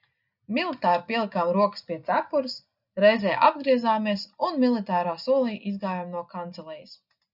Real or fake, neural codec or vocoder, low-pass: real; none; 5.4 kHz